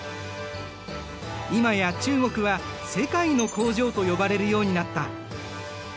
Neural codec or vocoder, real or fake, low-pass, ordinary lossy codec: none; real; none; none